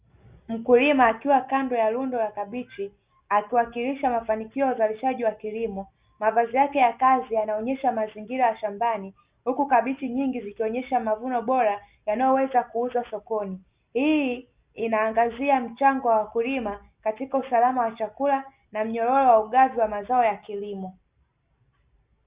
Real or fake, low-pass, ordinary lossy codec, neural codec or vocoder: real; 3.6 kHz; Opus, 64 kbps; none